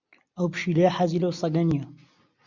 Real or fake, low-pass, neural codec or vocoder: real; 7.2 kHz; none